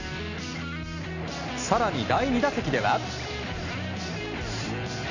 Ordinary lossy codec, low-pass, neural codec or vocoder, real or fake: none; 7.2 kHz; none; real